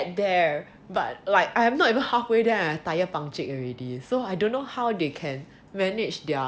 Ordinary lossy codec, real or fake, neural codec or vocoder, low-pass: none; real; none; none